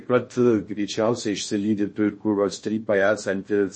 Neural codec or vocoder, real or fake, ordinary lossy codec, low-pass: codec, 16 kHz in and 24 kHz out, 0.6 kbps, FocalCodec, streaming, 2048 codes; fake; MP3, 32 kbps; 9.9 kHz